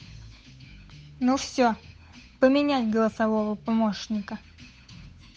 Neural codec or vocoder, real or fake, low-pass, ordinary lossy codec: codec, 16 kHz, 8 kbps, FunCodec, trained on Chinese and English, 25 frames a second; fake; none; none